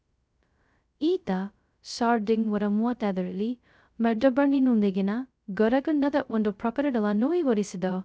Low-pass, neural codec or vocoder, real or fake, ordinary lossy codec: none; codec, 16 kHz, 0.2 kbps, FocalCodec; fake; none